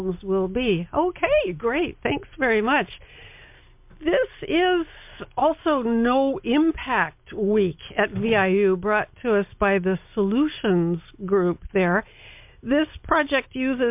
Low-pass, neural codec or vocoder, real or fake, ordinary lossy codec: 3.6 kHz; none; real; MP3, 32 kbps